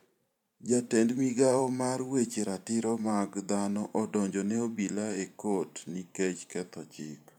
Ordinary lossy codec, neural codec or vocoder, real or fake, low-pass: none; vocoder, 44.1 kHz, 128 mel bands every 512 samples, BigVGAN v2; fake; 19.8 kHz